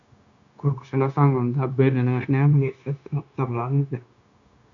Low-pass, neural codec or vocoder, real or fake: 7.2 kHz; codec, 16 kHz, 0.9 kbps, LongCat-Audio-Codec; fake